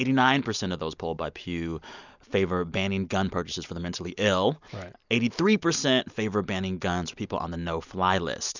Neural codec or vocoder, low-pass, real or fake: none; 7.2 kHz; real